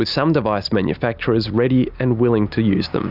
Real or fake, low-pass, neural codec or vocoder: real; 5.4 kHz; none